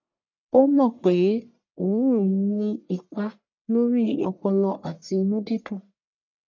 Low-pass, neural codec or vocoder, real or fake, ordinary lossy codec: 7.2 kHz; codec, 44.1 kHz, 1.7 kbps, Pupu-Codec; fake; none